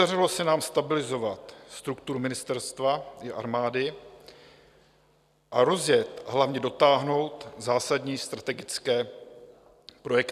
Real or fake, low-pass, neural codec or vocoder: real; 14.4 kHz; none